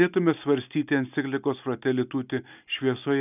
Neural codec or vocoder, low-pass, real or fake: none; 3.6 kHz; real